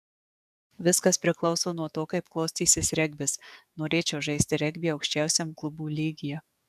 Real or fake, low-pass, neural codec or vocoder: fake; 14.4 kHz; codec, 44.1 kHz, 7.8 kbps, DAC